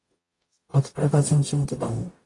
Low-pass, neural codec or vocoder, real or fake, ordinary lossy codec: 10.8 kHz; codec, 44.1 kHz, 0.9 kbps, DAC; fake; AAC, 32 kbps